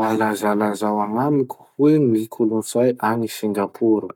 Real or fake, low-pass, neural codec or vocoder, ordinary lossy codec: fake; 19.8 kHz; codec, 44.1 kHz, 7.8 kbps, Pupu-Codec; none